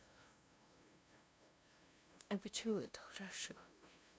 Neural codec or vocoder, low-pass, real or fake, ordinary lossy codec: codec, 16 kHz, 0.5 kbps, FunCodec, trained on LibriTTS, 25 frames a second; none; fake; none